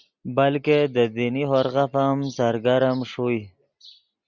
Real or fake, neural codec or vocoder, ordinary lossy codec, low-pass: real; none; Opus, 64 kbps; 7.2 kHz